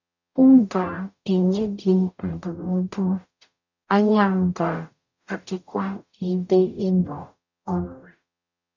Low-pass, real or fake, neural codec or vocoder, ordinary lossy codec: 7.2 kHz; fake; codec, 44.1 kHz, 0.9 kbps, DAC; none